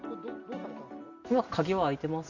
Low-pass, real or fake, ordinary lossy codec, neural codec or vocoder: 7.2 kHz; real; none; none